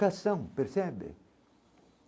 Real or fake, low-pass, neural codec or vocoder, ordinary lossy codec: real; none; none; none